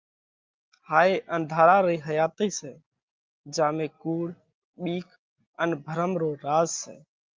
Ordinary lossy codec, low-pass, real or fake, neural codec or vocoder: Opus, 32 kbps; 7.2 kHz; real; none